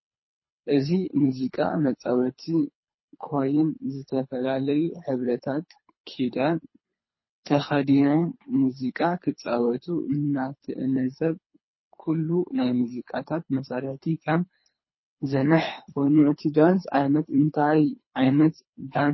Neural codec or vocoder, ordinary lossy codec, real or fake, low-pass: codec, 24 kHz, 3 kbps, HILCodec; MP3, 24 kbps; fake; 7.2 kHz